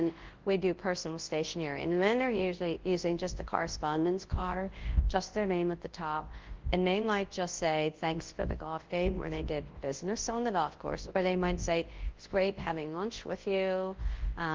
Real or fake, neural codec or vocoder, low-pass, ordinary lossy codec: fake; codec, 24 kHz, 0.9 kbps, WavTokenizer, large speech release; 7.2 kHz; Opus, 16 kbps